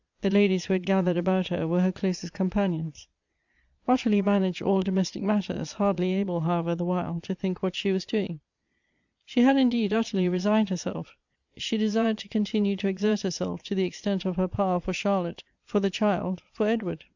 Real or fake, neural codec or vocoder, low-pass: fake; vocoder, 22.05 kHz, 80 mel bands, Vocos; 7.2 kHz